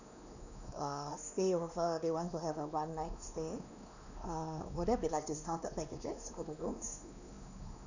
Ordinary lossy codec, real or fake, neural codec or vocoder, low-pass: none; fake; codec, 16 kHz, 2 kbps, X-Codec, WavLM features, trained on Multilingual LibriSpeech; 7.2 kHz